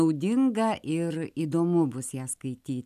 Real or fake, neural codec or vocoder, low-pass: real; none; 14.4 kHz